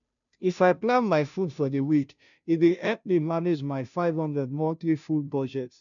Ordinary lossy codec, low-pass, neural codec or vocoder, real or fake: none; 7.2 kHz; codec, 16 kHz, 0.5 kbps, FunCodec, trained on Chinese and English, 25 frames a second; fake